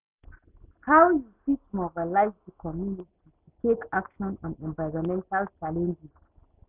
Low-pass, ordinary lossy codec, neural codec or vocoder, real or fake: 3.6 kHz; none; none; real